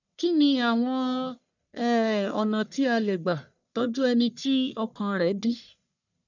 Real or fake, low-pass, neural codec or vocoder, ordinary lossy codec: fake; 7.2 kHz; codec, 44.1 kHz, 1.7 kbps, Pupu-Codec; none